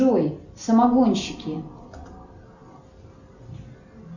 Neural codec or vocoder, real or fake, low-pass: none; real; 7.2 kHz